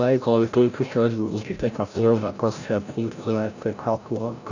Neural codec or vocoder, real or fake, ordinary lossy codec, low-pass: codec, 16 kHz, 0.5 kbps, FreqCodec, larger model; fake; none; 7.2 kHz